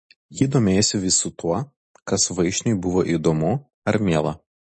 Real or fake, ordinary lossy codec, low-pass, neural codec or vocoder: real; MP3, 32 kbps; 10.8 kHz; none